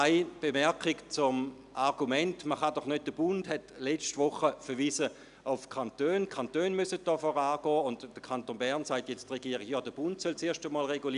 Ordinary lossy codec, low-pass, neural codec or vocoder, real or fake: none; 10.8 kHz; none; real